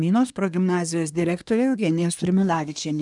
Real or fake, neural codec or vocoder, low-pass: fake; codec, 24 kHz, 1 kbps, SNAC; 10.8 kHz